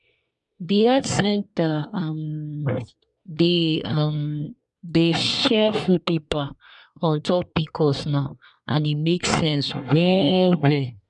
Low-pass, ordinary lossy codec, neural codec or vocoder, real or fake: 10.8 kHz; none; codec, 24 kHz, 1 kbps, SNAC; fake